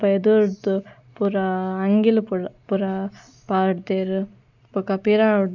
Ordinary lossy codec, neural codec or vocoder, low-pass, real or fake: none; none; 7.2 kHz; real